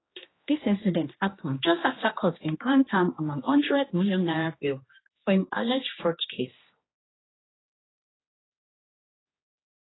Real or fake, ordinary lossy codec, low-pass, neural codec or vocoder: fake; AAC, 16 kbps; 7.2 kHz; codec, 16 kHz, 2 kbps, X-Codec, HuBERT features, trained on general audio